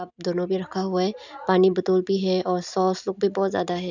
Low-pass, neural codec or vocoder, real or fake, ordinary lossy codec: 7.2 kHz; none; real; none